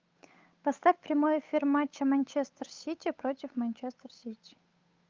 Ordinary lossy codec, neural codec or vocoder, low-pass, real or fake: Opus, 24 kbps; codec, 16 kHz, 8 kbps, FunCodec, trained on Chinese and English, 25 frames a second; 7.2 kHz; fake